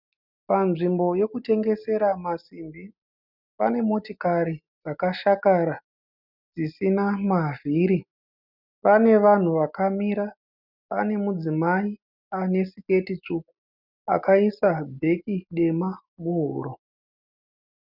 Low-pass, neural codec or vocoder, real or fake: 5.4 kHz; none; real